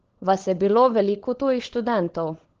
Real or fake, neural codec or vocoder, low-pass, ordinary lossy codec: fake; codec, 16 kHz, 16 kbps, FunCodec, trained on LibriTTS, 50 frames a second; 7.2 kHz; Opus, 16 kbps